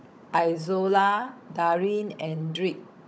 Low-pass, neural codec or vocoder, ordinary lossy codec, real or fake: none; codec, 16 kHz, 16 kbps, FunCodec, trained on Chinese and English, 50 frames a second; none; fake